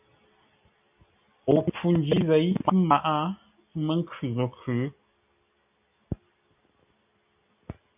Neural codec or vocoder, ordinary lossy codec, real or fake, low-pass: none; AAC, 32 kbps; real; 3.6 kHz